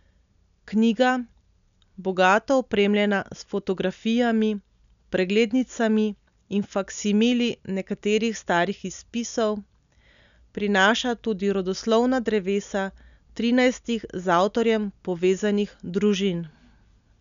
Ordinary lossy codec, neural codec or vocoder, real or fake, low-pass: none; none; real; 7.2 kHz